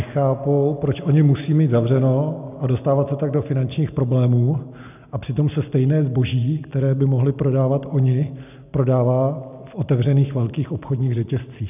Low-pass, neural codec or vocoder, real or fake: 3.6 kHz; none; real